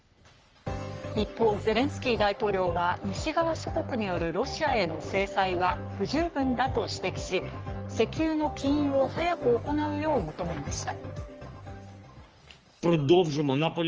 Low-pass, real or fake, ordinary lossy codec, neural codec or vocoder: 7.2 kHz; fake; Opus, 24 kbps; codec, 44.1 kHz, 3.4 kbps, Pupu-Codec